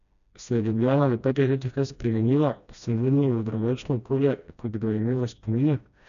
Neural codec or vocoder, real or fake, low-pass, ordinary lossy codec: codec, 16 kHz, 1 kbps, FreqCodec, smaller model; fake; 7.2 kHz; none